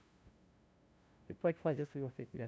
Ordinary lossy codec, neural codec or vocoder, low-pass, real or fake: none; codec, 16 kHz, 0.5 kbps, FunCodec, trained on LibriTTS, 25 frames a second; none; fake